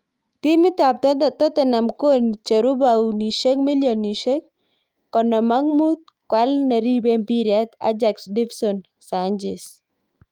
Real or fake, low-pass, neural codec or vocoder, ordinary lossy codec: fake; 19.8 kHz; autoencoder, 48 kHz, 128 numbers a frame, DAC-VAE, trained on Japanese speech; Opus, 32 kbps